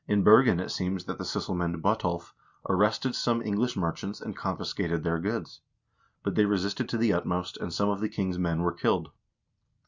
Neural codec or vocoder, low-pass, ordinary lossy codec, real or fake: none; 7.2 kHz; Opus, 64 kbps; real